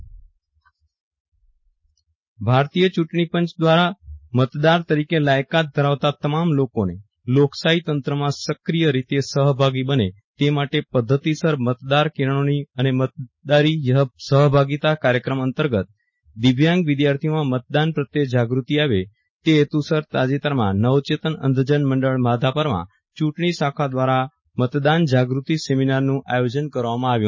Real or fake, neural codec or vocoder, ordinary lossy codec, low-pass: real; none; MP3, 32 kbps; 7.2 kHz